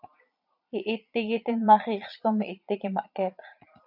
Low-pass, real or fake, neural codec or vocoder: 5.4 kHz; fake; vocoder, 22.05 kHz, 80 mel bands, Vocos